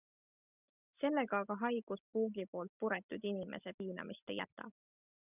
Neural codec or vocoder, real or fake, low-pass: none; real; 3.6 kHz